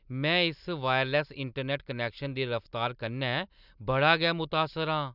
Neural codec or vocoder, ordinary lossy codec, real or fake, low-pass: none; none; real; 5.4 kHz